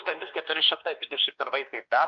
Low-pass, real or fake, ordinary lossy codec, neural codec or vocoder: 7.2 kHz; fake; Opus, 32 kbps; codec, 16 kHz, 2 kbps, X-Codec, WavLM features, trained on Multilingual LibriSpeech